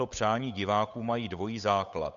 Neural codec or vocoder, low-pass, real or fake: codec, 16 kHz, 8 kbps, FunCodec, trained on Chinese and English, 25 frames a second; 7.2 kHz; fake